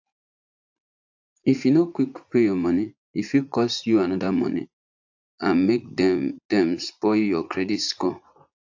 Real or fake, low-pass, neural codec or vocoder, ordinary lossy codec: fake; 7.2 kHz; vocoder, 44.1 kHz, 80 mel bands, Vocos; AAC, 48 kbps